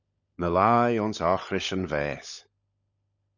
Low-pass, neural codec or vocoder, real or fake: 7.2 kHz; codec, 16 kHz, 16 kbps, FunCodec, trained on LibriTTS, 50 frames a second; fake